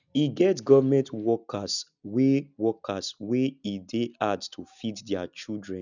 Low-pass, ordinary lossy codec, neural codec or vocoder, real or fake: 7.2 kHz; none; none; real